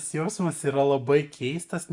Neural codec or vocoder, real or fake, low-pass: none; real; 10.8 kHz